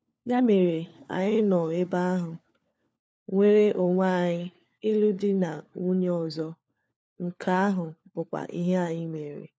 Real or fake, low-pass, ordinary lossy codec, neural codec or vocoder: fake; none; none; codec, 16 kHz, 4 kbps, FunCodec, trained on LibriTTS, 50 frames a second